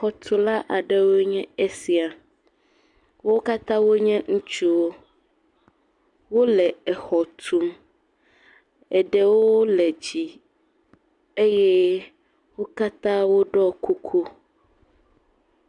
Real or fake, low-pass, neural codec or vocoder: real; 10.8 kHz; none